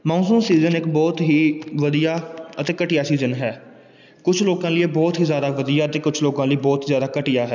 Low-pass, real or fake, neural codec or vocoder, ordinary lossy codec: 7.2 kHz; real; none; none